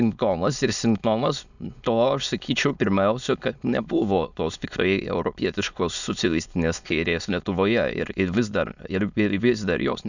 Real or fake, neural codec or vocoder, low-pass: fake; autoencoder, 22.05 kHz, a latent of 192 numbers a frame, VITS, trained on many speakers; 7.2 kHz